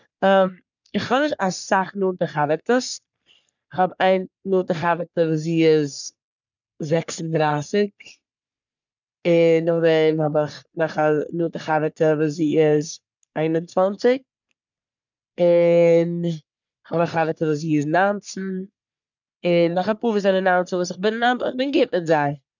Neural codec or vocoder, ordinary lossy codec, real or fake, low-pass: codec, 44.1 kHz, 3.4 kbps, Pupu-Codec; none; fake; 7.2 kHz